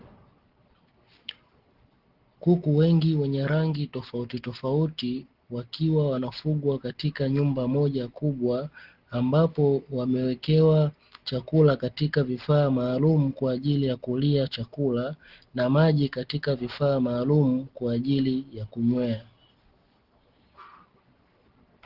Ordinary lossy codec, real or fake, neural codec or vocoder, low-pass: Opus, 16 kbps; real; none; 5.4 kHz